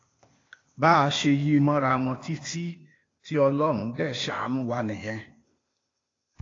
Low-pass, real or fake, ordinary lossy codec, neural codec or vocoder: 7.2 kHz; fake; AAC, 32 kbps; codec, 16 kHz, 0.8 kbps, ZipCodec